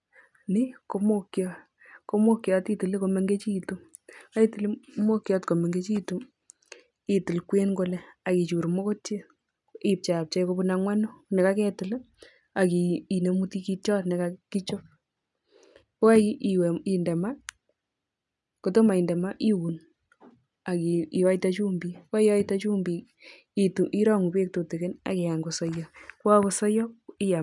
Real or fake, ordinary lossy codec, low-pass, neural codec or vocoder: real; none; 10.8 kHz; none